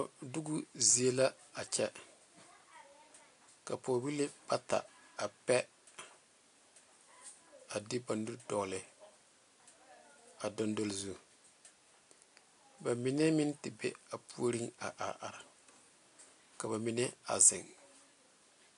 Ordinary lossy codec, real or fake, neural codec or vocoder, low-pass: AAC, 64 kbps; real; none; 10.8 kHz